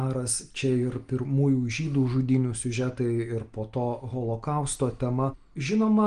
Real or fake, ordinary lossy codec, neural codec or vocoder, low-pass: real; Opus, 24 kbps; none; 9.9 kHz